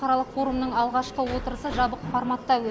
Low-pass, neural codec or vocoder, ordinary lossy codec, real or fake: none; none; none; real